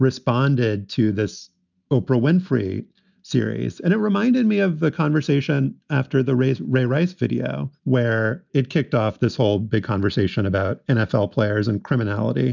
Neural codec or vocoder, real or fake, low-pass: none; real; 7.2 kHz